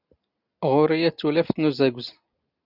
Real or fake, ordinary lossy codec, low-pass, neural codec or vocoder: real; Opus, 64 kbps; 5.4 kHz; none